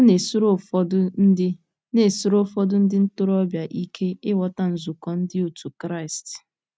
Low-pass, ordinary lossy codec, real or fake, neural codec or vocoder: none; none; real; none